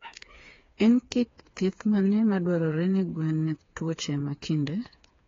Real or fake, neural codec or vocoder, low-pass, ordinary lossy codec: fake; codec, 16 kHz, 4 kbps, FreqCodec, smaller model; 7.2 kHz; AAC, 32 kbps